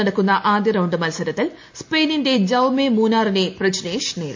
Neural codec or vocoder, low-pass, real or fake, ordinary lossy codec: none; 7.2 kHz; real; MP3, 48 kbps